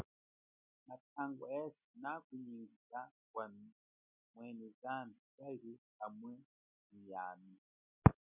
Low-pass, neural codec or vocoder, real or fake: 3.6 kHz; none; real